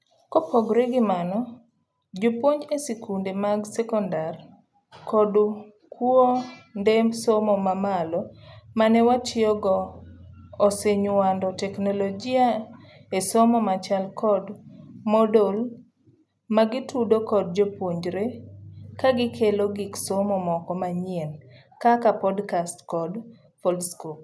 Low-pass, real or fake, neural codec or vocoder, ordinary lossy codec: none; real; none; none